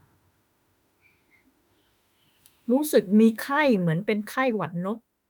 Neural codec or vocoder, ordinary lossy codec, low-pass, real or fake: autoencoder, 48 kHz, 32 numbers a frame, DAC-VAE, trained on Japanese speech; none; none; fake